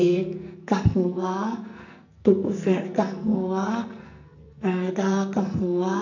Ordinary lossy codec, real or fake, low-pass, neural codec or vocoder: none; fake; 7.2 kHz; codec, 44.1 kHz, 2.6 kbps, SNAC